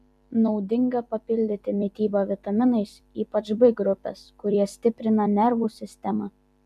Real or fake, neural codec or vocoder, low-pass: fake; vocoder, 44.1 kHz, 128 mel bands every 256 samples, BigVGAN v2; 14.4 kHz